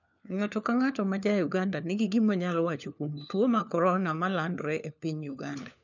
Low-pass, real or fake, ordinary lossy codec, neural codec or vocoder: 7.2 kHz; fake; none; codec, 16 kHz, 4 kbps, FunCodec, trained on LibriTTS, 50 frames a second